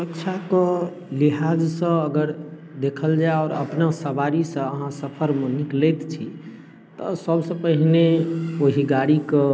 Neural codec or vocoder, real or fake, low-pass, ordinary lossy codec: none; real; none; none